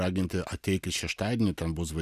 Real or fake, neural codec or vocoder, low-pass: real; none; 14.4 kHz